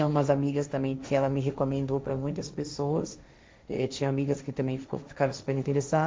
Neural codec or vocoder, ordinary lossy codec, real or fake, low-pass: codec, 16 kHz, 1.1 kbps, Voila-Tokenizer; none; fake; none